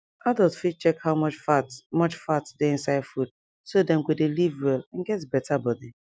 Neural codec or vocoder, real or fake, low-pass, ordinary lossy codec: none; real; none; none